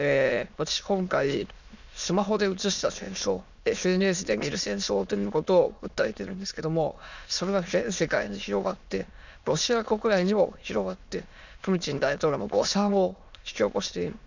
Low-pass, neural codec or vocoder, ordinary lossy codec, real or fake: 7.2 kHz; autoencoder, 22.05 kHz, a latent of 192 numbers a frame, VITS, trained on many speakers; none; fake